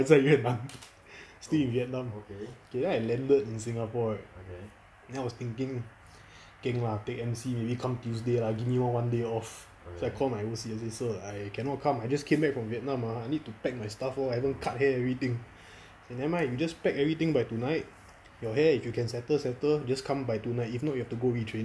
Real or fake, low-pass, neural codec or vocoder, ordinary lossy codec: real; none; none; none